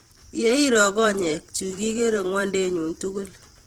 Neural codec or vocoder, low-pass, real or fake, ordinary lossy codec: vocoder, 44.1 kHz, 128 mel bands every 512 samples, BigVGAN v2; 19.8 kHz; fake; Opus, 16 kbps